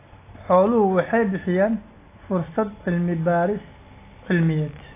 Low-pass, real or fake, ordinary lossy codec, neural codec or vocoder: 3.6 kHz; real; AAC, 24 kbps; none